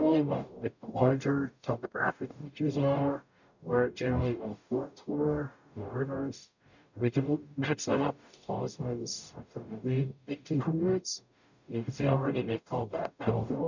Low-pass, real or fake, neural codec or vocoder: 7.2 kHz; fake; codec, 44.1 kHz, 0.9 kbps, DAC